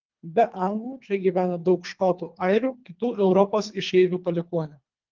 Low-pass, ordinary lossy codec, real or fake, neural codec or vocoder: 7.2 kHz; Opus, 32 kbps; fake; codec, 24 kHz, 3 kbps, HILCodec